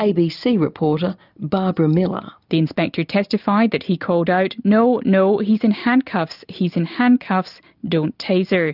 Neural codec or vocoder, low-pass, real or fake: none; 5.4 kHz; real